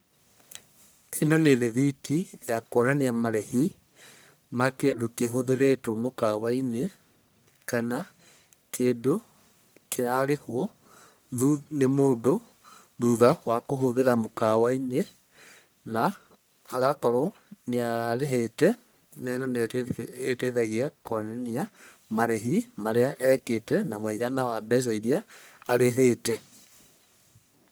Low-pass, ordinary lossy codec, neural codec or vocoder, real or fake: none; none; codec, 44.1 kHz, 1.7 kbps, Pupu-Codec; fake